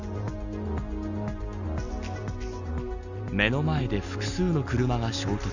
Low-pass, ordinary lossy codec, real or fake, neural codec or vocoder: 7.2 kHz; none; real; none